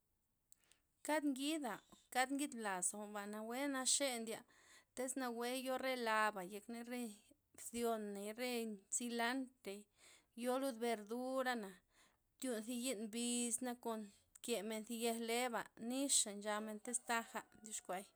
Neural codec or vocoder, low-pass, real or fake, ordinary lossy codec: none; none; real; none